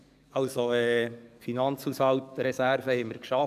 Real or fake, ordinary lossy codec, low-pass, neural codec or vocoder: fake; none; 14.4 kHz; codec, 44.1 kHz, 7.8 kbps, DAC